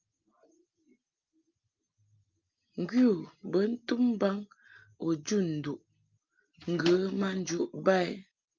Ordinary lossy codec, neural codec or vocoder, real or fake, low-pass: Opus, 24 kbps; none; real; 7.2 kHz